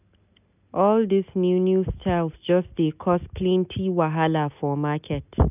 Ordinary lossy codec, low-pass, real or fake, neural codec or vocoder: none; 3.6 kHz; real; none